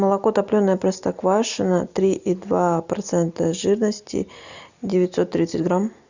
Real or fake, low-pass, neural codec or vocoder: real; 7.2 kHz; none